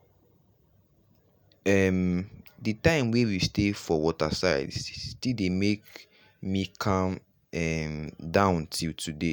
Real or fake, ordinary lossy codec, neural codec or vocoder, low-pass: real; none; none; none